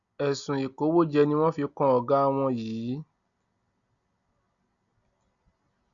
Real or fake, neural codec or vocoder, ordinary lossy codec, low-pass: real; none; none; 7.2 kHz